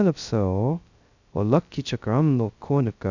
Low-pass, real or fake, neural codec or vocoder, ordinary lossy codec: 7.2 kHz; fake; codec, 16 kHz, 0.2 kbps, FocalCodec; none